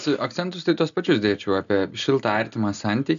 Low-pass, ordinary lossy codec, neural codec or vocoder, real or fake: 7.2 kHz; AAC, 96 kbps; none; real